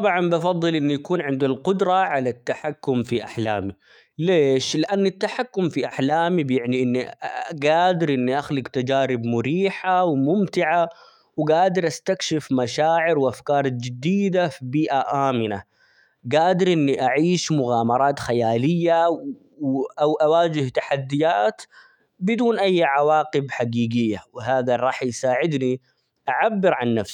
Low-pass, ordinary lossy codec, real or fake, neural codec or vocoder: 19.8 kHz; none; fake; autoencoder, 48 kHz, 128 numbers a frame, DAC-VAE, trained on Japanese speech